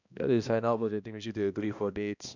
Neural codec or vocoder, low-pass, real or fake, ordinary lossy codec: codec, 16 kHz, 1 kbps, X-Codec, HuBERT features, trained on balanced general audio; 7.2 kHz; fake; none